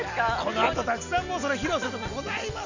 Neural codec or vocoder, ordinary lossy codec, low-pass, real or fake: none; none; 7.2 kHz; real